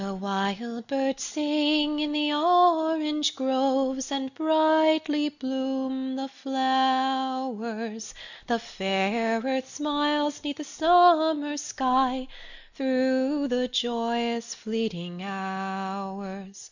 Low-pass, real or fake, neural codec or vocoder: 7.2 kHz; real; none